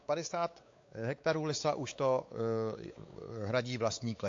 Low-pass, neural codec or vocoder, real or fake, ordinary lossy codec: 7.2 kHz; codec, 16 kHz, 4 kbps, X-Codec, WavLM features, trained on Multilingual LibriSpeech; fake; AAC, 48 kbps